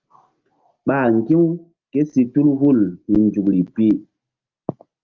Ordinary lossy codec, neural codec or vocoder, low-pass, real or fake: Opus, 24 kbps; none; 7.2 kHz; real